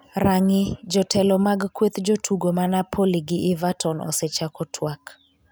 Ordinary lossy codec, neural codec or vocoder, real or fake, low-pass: none; none; real; none